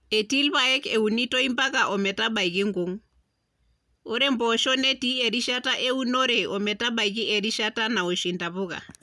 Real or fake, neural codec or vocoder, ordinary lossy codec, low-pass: real; none; none; none